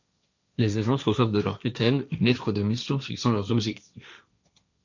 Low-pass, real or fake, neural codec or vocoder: 7.2 kHz; fake; codec, 16 kHz, 1.1 kbps, Voila-Tokenizer